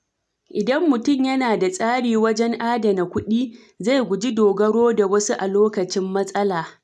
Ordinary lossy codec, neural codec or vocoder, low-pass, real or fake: none; none; none; real